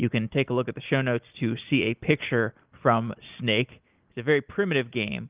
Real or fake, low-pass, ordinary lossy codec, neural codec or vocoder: real; 3.6 kHz; Opus, 24 kbps; none